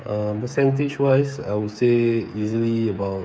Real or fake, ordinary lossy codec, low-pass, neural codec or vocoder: fake; none; none; codec, 16 kHz, 8 kbps, FreqCodec, smaller model